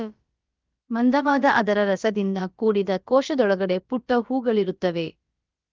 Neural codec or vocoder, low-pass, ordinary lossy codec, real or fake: codec, 16 kHz, about 1 kbps, DyCAST, with the encoder's durations; 7.2 kHz; Opus, 32 kbps; fake